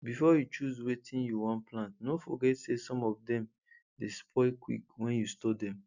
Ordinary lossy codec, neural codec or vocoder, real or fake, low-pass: none; none; real; 7.2 kHz